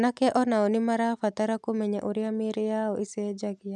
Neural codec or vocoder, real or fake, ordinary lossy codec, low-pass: none; real; none; none